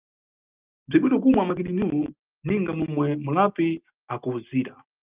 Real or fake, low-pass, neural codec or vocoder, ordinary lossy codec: real; 3.6 kHz; none; Opus, 32 kbps